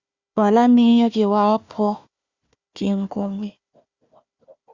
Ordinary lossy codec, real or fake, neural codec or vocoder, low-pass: Opus, 64 kbps; fake; codec, 16 kHz, 1 kbps, FunCodec, trained on Chinese and English, 50 frames a second; 7.2 kHz